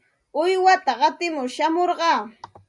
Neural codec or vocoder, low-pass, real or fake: none; 10.8 kHz; real